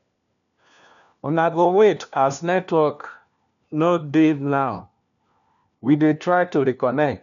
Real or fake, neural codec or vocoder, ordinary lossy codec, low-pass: fake; codec, 16 kHz, 1 kbps, FunCodec, trained on LibriTTS, 50 frames a second; none; 7.2 kHz